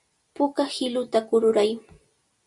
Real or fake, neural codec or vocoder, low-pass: real; none; 10.8 kHz